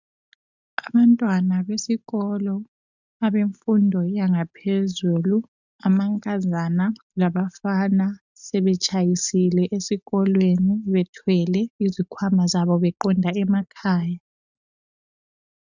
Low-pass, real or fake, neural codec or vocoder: 7.2 kHz; real; none